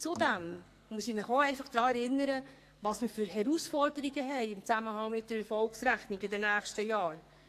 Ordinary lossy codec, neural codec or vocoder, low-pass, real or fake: AAC, 64 kbps; codec, 44.1 kHz, 2.6 kbps, SNAC; 14.4 kHz; fake